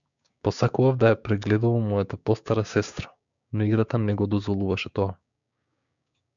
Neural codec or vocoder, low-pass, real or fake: codec, 16 kHz, 6 kbps, DAC; 7.2 kHz; fake